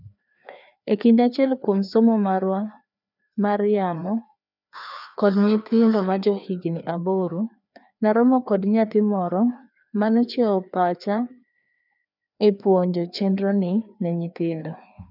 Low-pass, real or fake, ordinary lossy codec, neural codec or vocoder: 5.4 kHz; fake; none; codec, 16 kHz, 2 kbps, FreqCodec, larger model